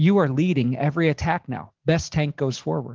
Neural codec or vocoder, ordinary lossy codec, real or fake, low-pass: none; Opus, 16 kbps; real; 7.2 kHz